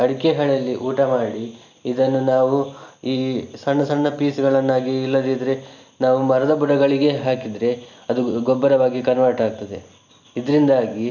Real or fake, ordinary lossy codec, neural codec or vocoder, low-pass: real; none; none; 7.2 kHz